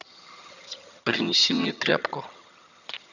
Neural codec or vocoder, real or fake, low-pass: vocoder, 22.05 kHz, 80 mel bands, HiFi-GAN; fake; 7.2 kHz